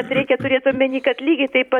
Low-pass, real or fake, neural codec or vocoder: 19.8 kHz; fake; vocoder, 44.1 kHz, 128 mel bands every 256 samples, BigVGAN v2